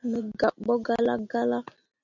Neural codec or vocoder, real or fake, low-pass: none; real; 7.2 kHz